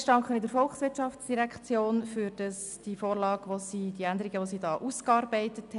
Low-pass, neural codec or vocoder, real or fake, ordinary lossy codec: 10.8 kHz; none; real; none